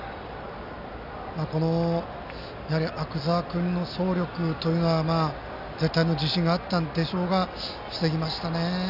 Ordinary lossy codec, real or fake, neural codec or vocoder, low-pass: none; real; none; 5.4 kHz